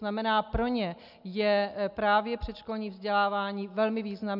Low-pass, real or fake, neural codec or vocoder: 5.4 kHz; real; none